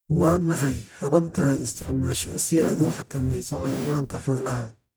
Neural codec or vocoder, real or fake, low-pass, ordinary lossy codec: codec, 44.1 kHz, 0.9 kbps, DAC; fake; none; none